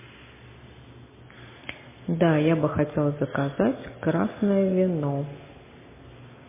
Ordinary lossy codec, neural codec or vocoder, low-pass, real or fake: MP3, 16 kbps; none; 3.6 kHz; real